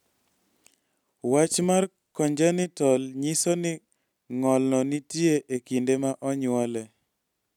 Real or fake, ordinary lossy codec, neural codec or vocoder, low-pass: real; none; none; 19.8 kHz